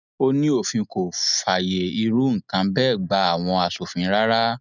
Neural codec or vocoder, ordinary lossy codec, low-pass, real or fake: none; none; 7.2 kHz; real